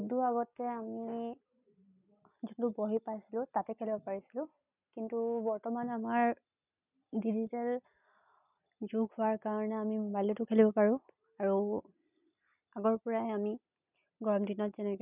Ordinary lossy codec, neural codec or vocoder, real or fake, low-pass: none; none; real; 3.6 kHz